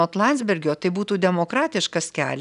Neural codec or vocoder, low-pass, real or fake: none; 10.8 kHz; real